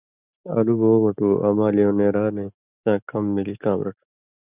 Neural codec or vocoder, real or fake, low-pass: none; real; 3.6 kHz